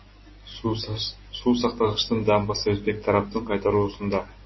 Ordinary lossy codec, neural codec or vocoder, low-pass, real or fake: MP3, 24 kbps; none; 7.2 kHz; real